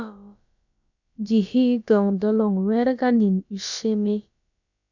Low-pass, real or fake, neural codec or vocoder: 7.2 kHz; fake; codec, 16 kHz, about 1 kbps, DyCAST, with the encoder's durations